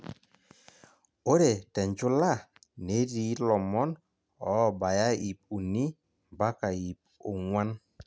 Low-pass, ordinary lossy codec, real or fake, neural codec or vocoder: none; none; real; none